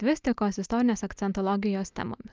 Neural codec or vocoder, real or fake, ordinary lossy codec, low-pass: none; real; Opus, 24 kbps; 7.2 kHz